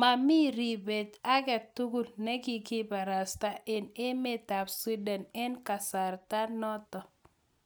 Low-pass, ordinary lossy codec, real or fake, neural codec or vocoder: none; none; real; none